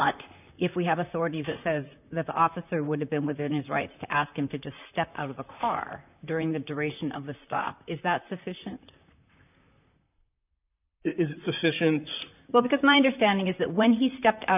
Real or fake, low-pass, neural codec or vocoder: fake; 3.6 kHz; vocoder, 44.1 kHz, 128 mel bands, Pupu-Vocoder